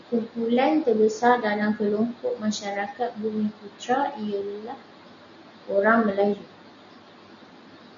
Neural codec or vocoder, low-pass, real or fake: none; 7.2 kHz; real